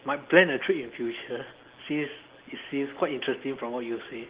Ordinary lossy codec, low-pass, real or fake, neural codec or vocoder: Opus, 16 kbps; 3.6 kHz; real; none